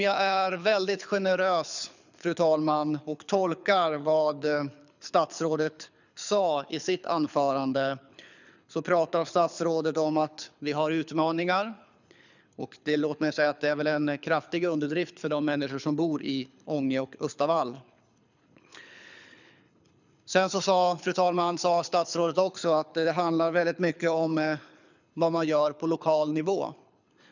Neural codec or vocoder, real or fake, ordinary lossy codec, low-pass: codec, 24 kHz, 6 kbps, HILCodec; fake; none; 7.2 kHz